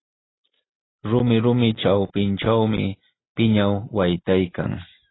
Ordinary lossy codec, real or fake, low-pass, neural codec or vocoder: AAC, 16 kbps; real; 7.2 kHz; none